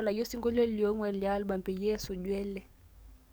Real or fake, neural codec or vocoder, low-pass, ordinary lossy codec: fake; codec, 44.1 kHz, 7.8 kbps, DAC; none; none